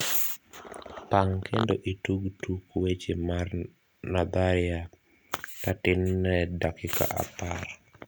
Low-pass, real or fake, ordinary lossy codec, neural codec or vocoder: none; real; none; none